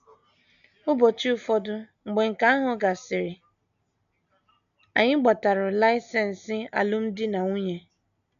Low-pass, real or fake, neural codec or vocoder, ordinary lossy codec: 7.2 kHz; real; none; none